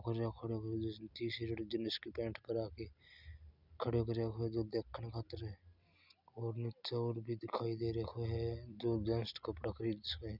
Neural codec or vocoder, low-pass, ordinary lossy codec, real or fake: none; 5.4 kHz; none; real